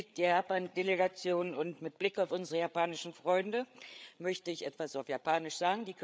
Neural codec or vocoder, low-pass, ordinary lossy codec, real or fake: codec, 16 kHz, 8 kbps, FreqCodec, larger model; none; none; fake